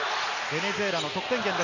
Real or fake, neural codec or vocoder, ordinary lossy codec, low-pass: real; none; none; 7.2 kHz